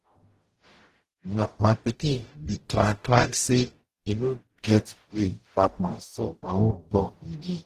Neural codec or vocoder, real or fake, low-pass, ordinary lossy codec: codec, 44.1 kHz, 0.9 kbps, DAC; fake; 14.4 kHz; Opus, 16 kbps